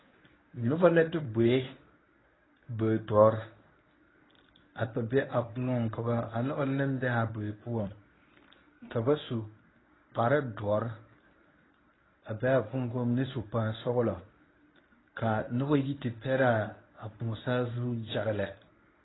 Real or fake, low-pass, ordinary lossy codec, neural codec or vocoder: fake; 7.2 kHz; AAC, 16 kbps; codec, 24 kHz, 0.9 kbps, WavTokenizer, medium speech release version 1